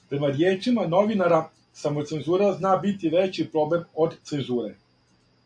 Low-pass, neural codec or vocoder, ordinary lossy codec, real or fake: 9.9 kHz; none; AAC, 64 kbps; real